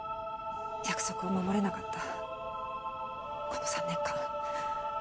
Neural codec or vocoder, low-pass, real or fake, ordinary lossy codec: none; none; real; none